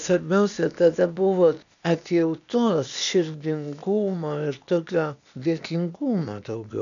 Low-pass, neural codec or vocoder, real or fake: 7.2 kHz; codec, 16 kHz, 0.8 kbps, ZipCodec; fake